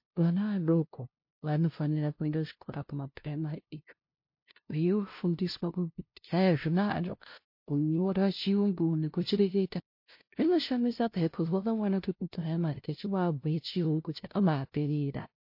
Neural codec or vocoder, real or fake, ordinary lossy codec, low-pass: codec, 16 kHz, 0.5 kbps, FunCodec, trained on LibriTTS, 25 frames a second; fake; MP3, 32 kbps; 5.4 kHz